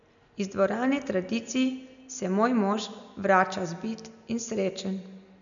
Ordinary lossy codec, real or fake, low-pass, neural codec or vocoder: none; real; 7.2 kHz; none